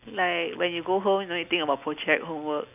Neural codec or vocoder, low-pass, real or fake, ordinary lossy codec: none; 3.6 kHz; real; none